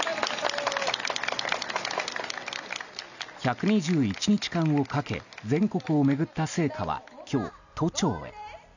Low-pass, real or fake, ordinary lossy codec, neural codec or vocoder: 7.2 kHz; real; none; none